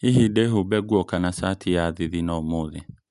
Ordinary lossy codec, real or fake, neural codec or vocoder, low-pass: none; real; none; 10.8 kHz